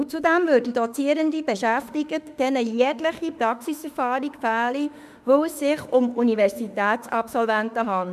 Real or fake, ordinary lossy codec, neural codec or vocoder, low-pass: fake; none; autoencoder, 48 kHz, 32 numbers a frame, DAC-VAE, trained on Japanese speech; 14.4 kHz